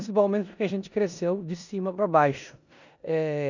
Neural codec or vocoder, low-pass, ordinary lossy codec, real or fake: codec, 16 kHz in and 24 kHz out, 0.9 kbps, LongCat-Audio-Codec, four codebook decoder; 7.2 kHz; none; fake